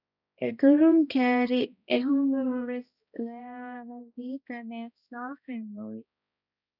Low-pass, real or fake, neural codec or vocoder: 5.4 kHz; fake; codec, 16 kHz, 1 kbps, X-Codec, HuBERT features, trained on balanced general audio